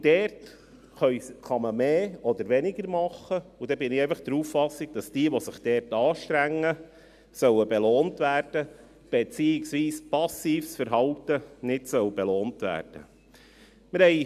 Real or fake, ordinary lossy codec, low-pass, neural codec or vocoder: real; none; 14.4 kHz; none